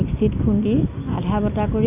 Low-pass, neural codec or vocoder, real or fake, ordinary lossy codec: 3.6 kHz; none; real; none